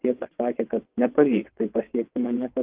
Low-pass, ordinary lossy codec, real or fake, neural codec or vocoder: 3.6 kHz; Opus, 24 kbps; fake; vocoder, 22.05 kHz, 80 mel bands, WaveNeXt